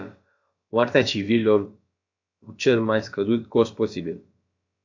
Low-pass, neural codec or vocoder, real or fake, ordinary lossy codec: 7.2 kHz; codec, 16 kHz, about 1 kbps, DyCAST, with the encoder's durations; fake; AAC, 48 kbps